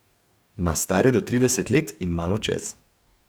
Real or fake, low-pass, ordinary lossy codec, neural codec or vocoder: fake; none; none; codec, 44.1 kHz, 2.6 kbps, DAC